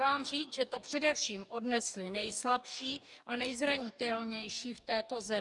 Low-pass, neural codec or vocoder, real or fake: 10.8 kHz; codec, 44.1 kHz, 2.6 kbps, DAC; fake